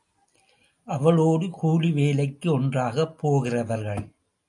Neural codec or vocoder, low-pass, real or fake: none; 10.8 kHz; real